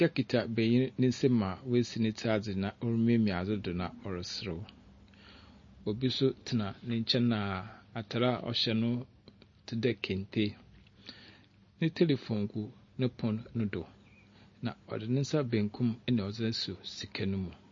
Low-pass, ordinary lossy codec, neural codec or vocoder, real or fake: 7.2 kHz; MP3, 32 kbps; none; real